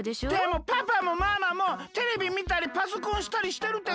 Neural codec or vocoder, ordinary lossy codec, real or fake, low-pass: none; none; real; none